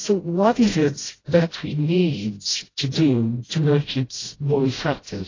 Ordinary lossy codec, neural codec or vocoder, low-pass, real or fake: AAC, 32 kbps; codec, 16 kHz, 0.5 kbps, FreqCodec, smaller model; 7.2 kHz; fake